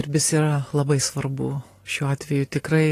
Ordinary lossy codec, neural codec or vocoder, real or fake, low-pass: AAC, 48 kbps; none; real; 14.4 kHz